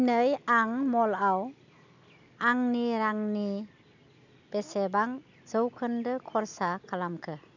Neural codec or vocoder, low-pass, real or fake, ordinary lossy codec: none; 7.2 kHz; real; none